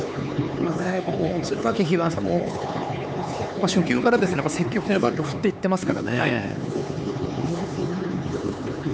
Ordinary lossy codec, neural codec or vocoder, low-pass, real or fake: none; codec, 16 kHz, 4 kbps, X-Codec, HuBERT features, trained on LibriSpeech; none; fake